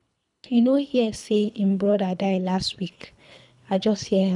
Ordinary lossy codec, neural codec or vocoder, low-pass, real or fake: none; codec, 24 kHz, 3 kbps, HILCodec; 10.8 kHz; fake